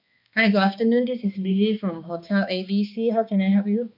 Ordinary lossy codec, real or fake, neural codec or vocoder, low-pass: none; fake; codec, 16 kHz, 2 kbps, X-Codec, HuBERT features, trained on balanced general audio; 5.4 kHz